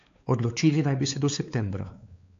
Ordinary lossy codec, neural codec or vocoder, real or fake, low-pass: AAC, 48 kbps; codec, 16 kHz, 4 kbps, X-Codec, WavLM features, trained on Multilingual LibriSpeech; fake; 7.2 kHz